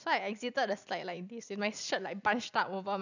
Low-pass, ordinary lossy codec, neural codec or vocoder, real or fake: 7.2 kHz; none; none; real